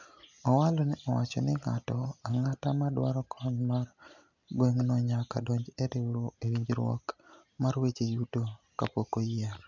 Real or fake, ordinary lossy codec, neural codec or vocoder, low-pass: real; none; none; 7.2 kHz